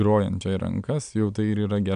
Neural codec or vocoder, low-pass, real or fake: none; 9.9 kHz; real